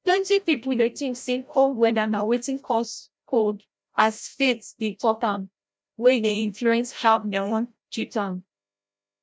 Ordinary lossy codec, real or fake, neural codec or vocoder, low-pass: none; fake; codec, 16 kHz, 0.5 kbps, FreqCodec, larger model; none